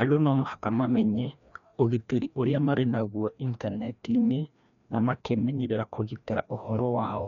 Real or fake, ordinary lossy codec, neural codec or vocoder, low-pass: fake; none; codec, 16 kHz, 1 kbps, FreqCodec, larger model; 7.2 kHz